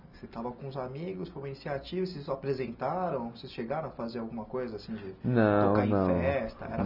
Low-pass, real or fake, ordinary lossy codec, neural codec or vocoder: 5.4 kHz; real; none; none